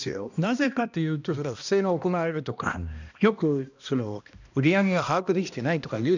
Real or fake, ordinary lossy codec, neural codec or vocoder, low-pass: fake; none; codec, 16 kHz, 1 kbps, X-Codec, HuBERT features, trained on balanced general audio; 7.2 kHz